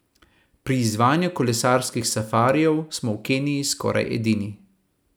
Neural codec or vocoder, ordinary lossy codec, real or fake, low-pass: none; none; real; none